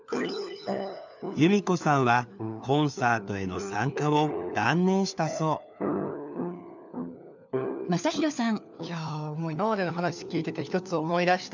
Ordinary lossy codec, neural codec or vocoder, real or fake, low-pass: none; codec, 16 kHz, 4 kbps, FunCodec, trained on LibriTTS, 50 frames a second; fake; 7.2 kHz